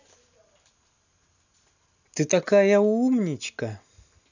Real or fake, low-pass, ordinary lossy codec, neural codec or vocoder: real; 7.2 kHz; none; none